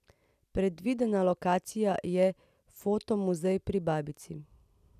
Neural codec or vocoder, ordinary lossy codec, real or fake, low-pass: none; none; real; 14.4 kHz